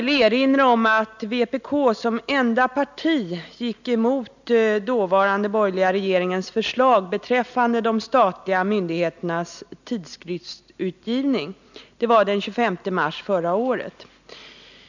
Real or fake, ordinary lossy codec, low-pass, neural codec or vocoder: real; none; 7.2 kHz; none